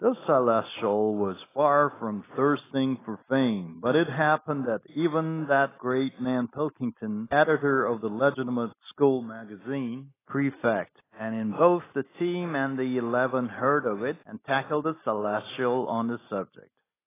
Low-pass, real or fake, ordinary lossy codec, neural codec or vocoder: 3.6 kHz; real; AAC, 16 kbps; none